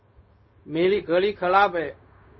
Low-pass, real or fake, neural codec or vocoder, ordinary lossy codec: 7.2 kHz; fake; codec, 16 kHz, 0.4 kbps, LongCat-Audio-Codec; MP3, 24 kbps